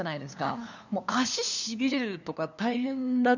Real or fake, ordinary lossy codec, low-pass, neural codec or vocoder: fake; none; 7.2 kHz; codec, 16 kHz, 2 kbps, FunCodec, trained on LibriTTS, 25 frames a second